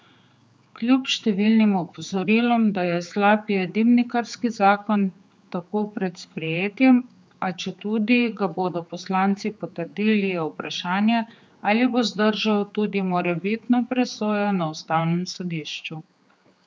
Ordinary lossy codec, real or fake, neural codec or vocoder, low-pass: none; fake; codec, 16 kHz, 4 kbps, X-Codec, HuBERT features, trained on general audio; none